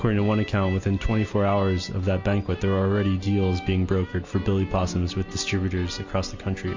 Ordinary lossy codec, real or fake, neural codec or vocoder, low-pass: MP3, 48 kbps; real; none; 7.2 kHz